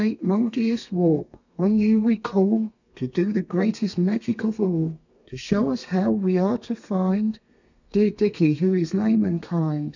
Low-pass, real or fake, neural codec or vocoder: 7.2 kHz; fake; codec, 32 kHz, 1.9 kbps, SNAC